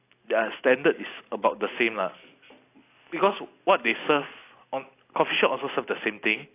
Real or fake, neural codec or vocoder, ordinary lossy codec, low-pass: real; none; AAC, 24 kbps; 3.6 kHz